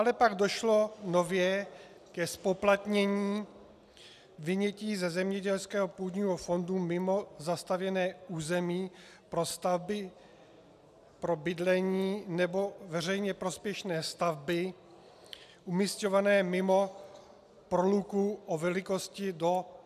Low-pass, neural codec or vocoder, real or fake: 14.4 kHz; none; real